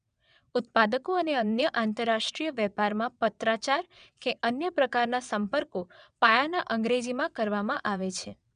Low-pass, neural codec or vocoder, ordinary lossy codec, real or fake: 9.9 kHz; vocoder, 22.05 kHz, 80 mel bands, WaveNeXt; none; fake